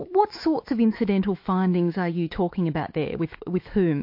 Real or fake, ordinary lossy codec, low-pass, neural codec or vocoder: fake; MP3, 32 kbps; 5.4 kHz; autoencoder, 48 kHz, 128 numbers a frame, DAC-VAE, trained on Japanese speech